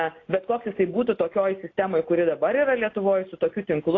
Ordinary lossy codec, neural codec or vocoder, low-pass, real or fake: AAC, 32 kbps; none; 7.2 kHz; real